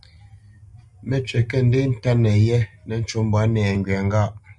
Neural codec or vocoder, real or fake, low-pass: none; real; 10.8 kHz